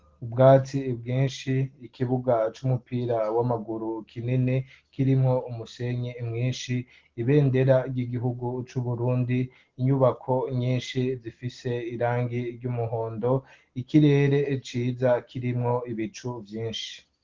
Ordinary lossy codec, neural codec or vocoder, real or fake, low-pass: Opus, 16 kbps; none; real; 7.2 kHz